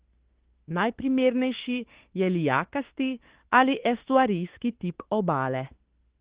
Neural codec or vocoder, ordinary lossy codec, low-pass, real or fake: autoencoder, 48 kHz, 32 numbers a frame, DAC-VAE, trained on Japanese speech; Opus, 16 kbps; 3.6 kHz; fake